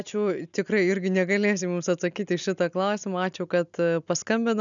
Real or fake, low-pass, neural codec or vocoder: real; 7.2 kHz; none